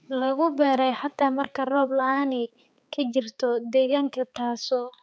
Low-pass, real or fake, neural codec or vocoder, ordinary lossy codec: none; fake; codec, 16 kHz, 4 kbps, X-Codec, HuBERT features, trained on balanced general audio; none